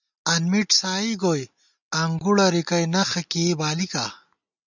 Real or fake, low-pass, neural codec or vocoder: real; 7.2 kHz; none